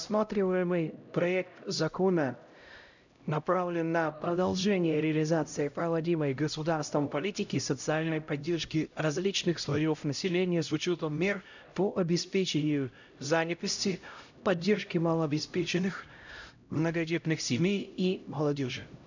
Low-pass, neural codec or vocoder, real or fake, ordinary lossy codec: 7.2 kHz; codec, 16 kHz, 0.5 kbps, X-Codec, HuBERT features, trained on LibriSpeech; fake; none